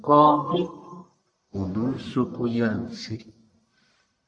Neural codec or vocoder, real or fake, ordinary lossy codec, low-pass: codec, 44.1 kHz, 1.7 kbps, Pupu-Codec; fake; AAC, 64 kbps; 9.9 kHz